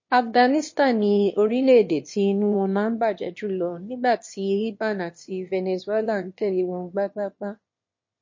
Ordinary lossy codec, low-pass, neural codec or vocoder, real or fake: MP3, 32 kbps; 7.2 kHz; autoencoder, 22.05 kHz, a latent of 192 numbers a frame, VITS, trained on one speaker; fake